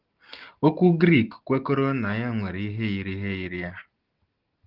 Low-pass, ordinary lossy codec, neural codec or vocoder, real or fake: 5.4 kHz; Opus, 16 kbps; none; real